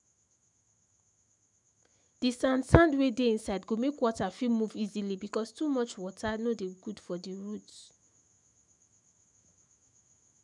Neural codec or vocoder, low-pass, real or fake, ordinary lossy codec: autoencoder, 48 kHz, 128 numbers a frame, DAC-VAE, trained on Japanese speech; 10.8 kHz; fake; none